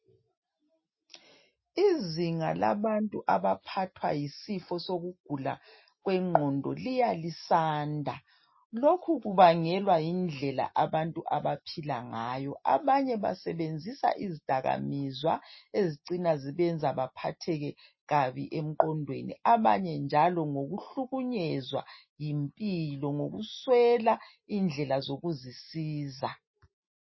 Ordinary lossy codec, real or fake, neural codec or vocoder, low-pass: MP3, 24 kbps; real; none; 7.2 kHz